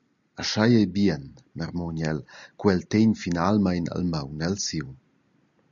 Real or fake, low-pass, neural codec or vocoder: real; 7.2 kHz; none